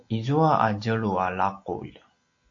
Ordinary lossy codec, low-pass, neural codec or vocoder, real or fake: AAC, 48 kbps; 7.2 kHz; none; real